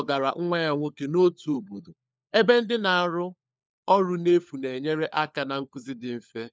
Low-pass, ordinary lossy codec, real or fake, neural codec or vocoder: none; none; fake; codec, 16 kHz, 4 kbps, FunCodec, trained on LibriTTS, 50 frames a second